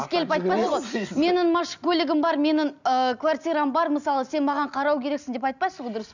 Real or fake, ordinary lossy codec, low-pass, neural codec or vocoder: real; none; 7.2 kHz; none